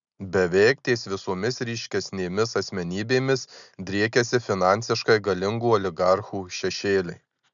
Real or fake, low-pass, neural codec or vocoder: real; 7.2 kHz; none